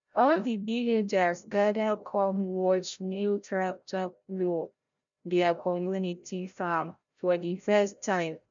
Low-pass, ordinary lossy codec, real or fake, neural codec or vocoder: 7.2 kHz; none; fake; codec, 16 kHz, 0.5 kbps, FreqCodec, larger model